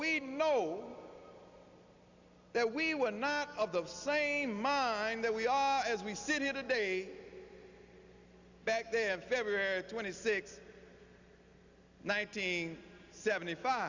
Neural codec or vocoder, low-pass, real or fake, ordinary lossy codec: none; 7.2 kHz; real; Opus, 64 kbps